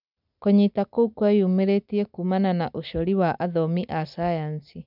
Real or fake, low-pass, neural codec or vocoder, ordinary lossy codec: real; 5.4 kHz; none; none